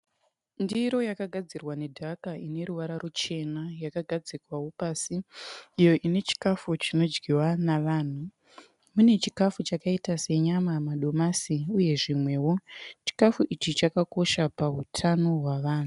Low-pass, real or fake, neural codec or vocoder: 10.8 kHz; real; none